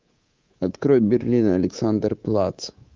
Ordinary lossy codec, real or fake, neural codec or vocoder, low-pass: Opus, 32 kbps; fake; codec, 24 kHz, 3.1 kbps, DualCodec; 7.2 kHz